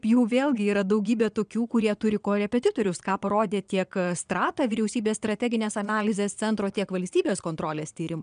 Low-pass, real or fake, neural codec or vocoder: 9.9 kHz; fake; vocoder, 22.05 kHz, 80 mel bands, Vocos